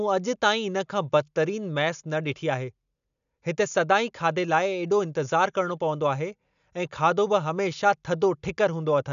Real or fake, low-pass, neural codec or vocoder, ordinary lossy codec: real; 7.2 kHz; none; none